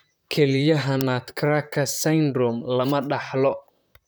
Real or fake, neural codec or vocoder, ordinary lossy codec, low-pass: fake; vocoder, 44.1 kHz, 128 mel bands, Pupu-Vocoder; none; none